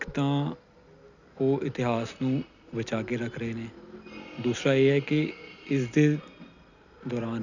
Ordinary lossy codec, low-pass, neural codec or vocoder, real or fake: none; 7.2 kHz; none; real